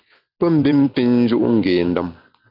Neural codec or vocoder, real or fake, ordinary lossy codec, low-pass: vocoder, 44.1 kHz, 128 mel bands every 256 samples, BigVGAN v2; fake; AAC, 48 kbps; 5.4 kHz